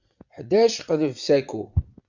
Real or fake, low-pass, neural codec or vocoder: fake; 7.2 kHz; vocoder, 44.1 kHz, 80 mel bands, Vocos